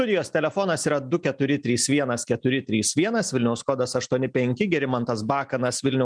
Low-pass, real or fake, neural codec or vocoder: 9.9 kHz; real; none